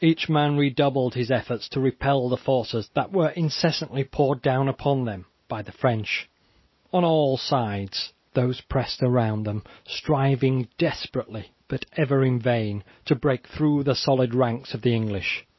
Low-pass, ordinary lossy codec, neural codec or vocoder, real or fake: 7.2 kHz; MP3, 24 kbps; none; real